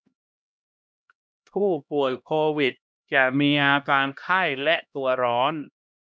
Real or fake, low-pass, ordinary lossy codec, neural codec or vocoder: fake; none; none; codec, 16 kHz, 1 kbps, X-Codec, HuBERT features, trained on LibriSpeech